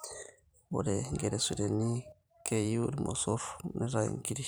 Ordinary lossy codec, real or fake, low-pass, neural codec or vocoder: none; fake; none; vocoder, 44.1 kHz, 128 mel bands every 512 samples, BigVGAN v2